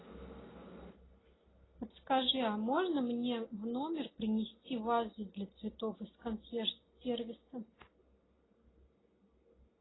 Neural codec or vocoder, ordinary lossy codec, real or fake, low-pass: none; AAC, 16 kbps; real; 7.2 kHz